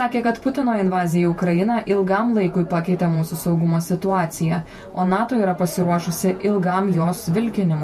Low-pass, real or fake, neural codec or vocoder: 14.4 kHz; fake; vocoder, 48 kHz, 128 mel bands, Vocos